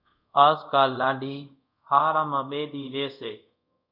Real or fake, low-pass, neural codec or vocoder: fake; 5.4 kHz; codec, 24 kHz, 0.5 kbps, DualCodec